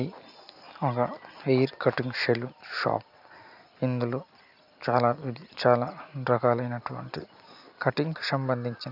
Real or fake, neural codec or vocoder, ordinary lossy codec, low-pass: real; none; AAC, 48 kbps; 5.4 kHz